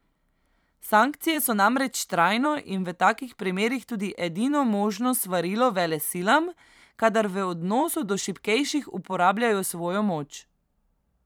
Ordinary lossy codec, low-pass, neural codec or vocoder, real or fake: none; none; vocoder, 44.1 kHz, 128 mel bands every 512 samples, BigVGAN v2; fake